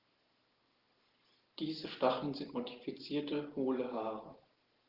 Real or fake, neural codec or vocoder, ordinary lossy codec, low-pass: real; none; Opus, 16 kbps; 5.4 kHz